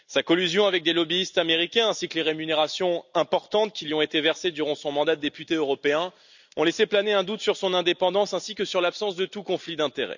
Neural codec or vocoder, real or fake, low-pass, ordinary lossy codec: none; real; 7.2 kHz; none